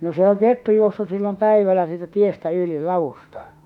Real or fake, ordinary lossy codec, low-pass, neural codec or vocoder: fake; none; 19.8 kHz; autoencoder, 48 kHz, 32 numbers a frame, DAC-VAE, trained on Japanese speech